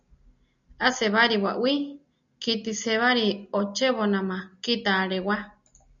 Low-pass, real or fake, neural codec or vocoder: 7.2 kHz; real; none